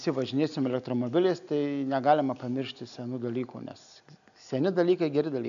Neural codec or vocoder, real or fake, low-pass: none; real; 7.2 kHz